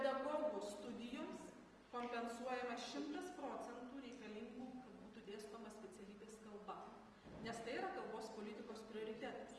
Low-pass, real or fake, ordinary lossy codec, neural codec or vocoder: 10.8 kHz; real; Opus, 24 kbps; none